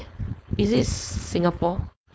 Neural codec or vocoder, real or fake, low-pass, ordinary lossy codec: codec, 16 kHz, 4.8 kbps, FACodec; fake; none; none